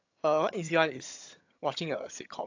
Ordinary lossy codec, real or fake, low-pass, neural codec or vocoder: AAC, 48 kbps; fake; 7.2 kHz; vocoder, 22.05 kHz, 80 mel bands, HiFi-GAN